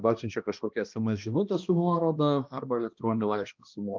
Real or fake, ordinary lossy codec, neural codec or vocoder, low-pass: fake; Opus, 24 kbps; codec, 16 kHz, 1 kbps, X-Codec, HuBERT features, trained on balanced general audio; 7.2 kHz